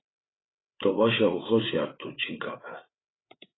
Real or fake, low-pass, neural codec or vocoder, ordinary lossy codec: fake; 7.2 kHz; codec, 16 kHz, 8 kbps, FreqCodec, larger model; AAC, 16 kbps